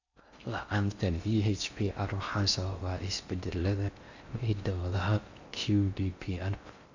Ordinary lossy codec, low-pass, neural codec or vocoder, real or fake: Opus, 64 kbps; 7.2 kHz; codec, 16 kHz in and 24 kHz out, 0.6 kbps, FocalCodec, streaming, 4096 codes; fake